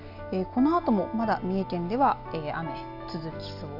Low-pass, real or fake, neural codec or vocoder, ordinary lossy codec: 5.4 kHz; real; none; AAC, 48 kbps